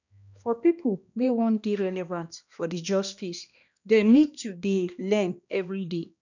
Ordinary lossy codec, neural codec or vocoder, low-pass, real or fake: none; codec, 16 kHz, 1 kbps, X-Codec, HuBERT features, trained on balanced general audio; 7.2 kHz; fake